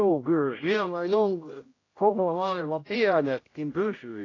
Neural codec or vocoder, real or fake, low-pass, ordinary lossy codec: codec, 16 kHz, 0.5 kbps, X-Codec, HuBERT features, trained on general audio; fake; 7.2 kHz; AAC, 32 kbps